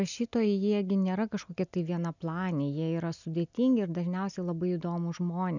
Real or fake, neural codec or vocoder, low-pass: real; none; 7.2 kHz